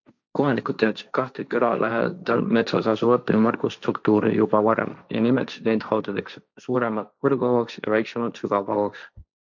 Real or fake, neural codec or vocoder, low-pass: fake; codec, 16 kHz, 1.1 kbps, Voila-Tokenizer; 7.2 kHz